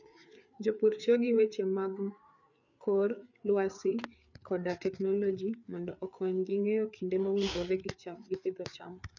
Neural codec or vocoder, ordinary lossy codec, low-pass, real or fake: codec, 16 kHz, 4 kbps, FreqCodec, larger model; none; 7.2 kHz; fake